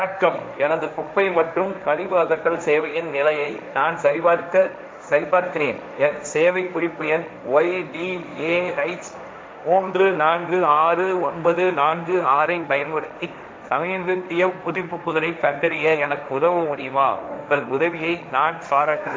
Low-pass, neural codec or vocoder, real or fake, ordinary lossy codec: none; codec, 16 kHz, 1.1 kbps, Voila-Tokenizer; fake; none